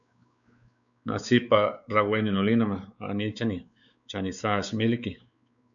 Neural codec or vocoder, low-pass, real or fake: codec, 16 kHz, 4 kbps, X-Codec, WavLM features, trained on Multilingual LibriSpeech; 7.2 kHz; fake